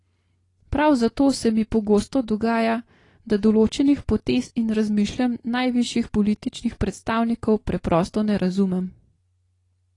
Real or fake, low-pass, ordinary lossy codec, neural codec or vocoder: real; 10.8 kHz; AAC, 32 kbps; none